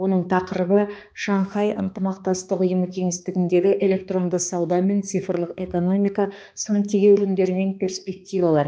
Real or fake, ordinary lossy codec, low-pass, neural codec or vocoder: fake; none; none; codec, 16 kHz, 2 kbps, X-Codec, HuBERT features, trained on balanced general audio